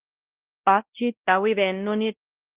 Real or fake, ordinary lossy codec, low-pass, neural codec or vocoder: fake; Opus, 16 kbps; 3.6 kHz; codec, 16 kHz, 0.5 kbps, X-Codec, WavLM features, trained on Multilingual LibriSpeech